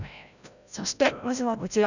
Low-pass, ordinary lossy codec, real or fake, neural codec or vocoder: 7.2 kHz; none; fake; codec, 16 kHz, 0.5 kbps, FreqCodec, larger model